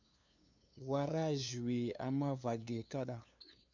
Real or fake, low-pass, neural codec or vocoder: fake; 7.2 kHz; codec, 16 kHz, 2 kbps, FunCodec, trained on LibriTTS, 25 frames a second